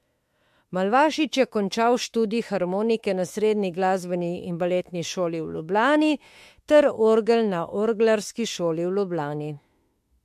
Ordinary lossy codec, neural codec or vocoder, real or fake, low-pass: MP3, 64 kbps; autoencoder, 48 kHz, 32 numbers a frame, DAC-VAE, trained on Japanese speech; fake; 14.4 kHz